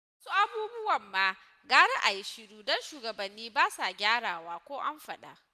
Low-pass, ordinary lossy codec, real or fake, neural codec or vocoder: 14.4 kHz; none; real; none